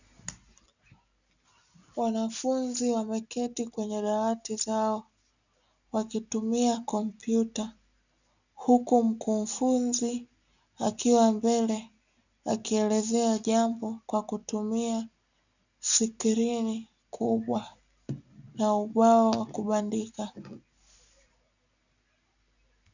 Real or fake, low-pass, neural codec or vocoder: real; 7.2 kHz; none